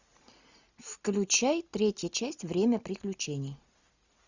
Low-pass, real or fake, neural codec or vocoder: 7.2 kHz; real; none